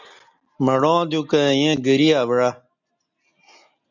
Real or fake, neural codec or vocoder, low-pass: real; none; 7.2 kHz